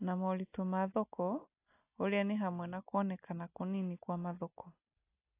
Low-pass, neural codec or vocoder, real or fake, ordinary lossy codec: 3.6 kHz; none; real; AAC, 24 kbps